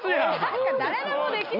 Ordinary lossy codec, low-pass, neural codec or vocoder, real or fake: none; 5.4 kHz; none; real